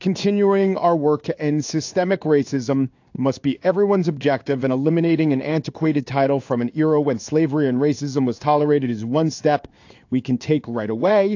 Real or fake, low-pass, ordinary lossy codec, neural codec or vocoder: fake; 7.2 kHz; AAC, 48 kbps; codec, 16 kHz in and 24 kHz out, 1 kbps, XY-Tokenizer